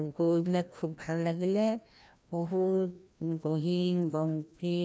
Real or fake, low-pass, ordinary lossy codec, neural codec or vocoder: fake; none; none; codec, 16 kHz, 1 kbps, FreqCodec, larger model